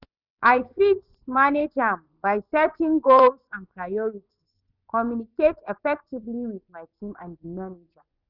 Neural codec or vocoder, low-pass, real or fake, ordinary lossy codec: vocoder, 24 kHz, 100 mel bands, Vocos; 5.4 kHz; fake; none